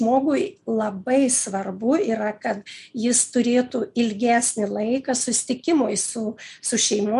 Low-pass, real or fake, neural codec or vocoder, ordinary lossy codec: 10.8 kHz; real; none; MP3, 96 kbps